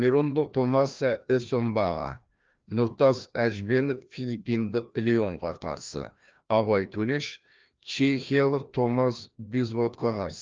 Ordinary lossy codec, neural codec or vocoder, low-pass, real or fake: Opus, 24 kbps; codec, 16 kHz, 1 kbps, FreqCodec, larger model; 7.2 kHz; fake